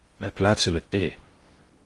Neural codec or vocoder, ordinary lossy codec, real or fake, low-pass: codec, 16 kHz in and 24 kHz out, 0.6 kbps, FocalCodec, streaming, 2048 codes; Opus, 24 kbps; fake; 10.8 kHz